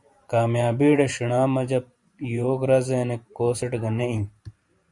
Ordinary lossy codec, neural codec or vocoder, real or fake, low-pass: Opus, 64 kbps; vocoder, 44.1 kHz, 128 mel bands every 512 samples, BigVGAN v2; fake; 10.8 kHz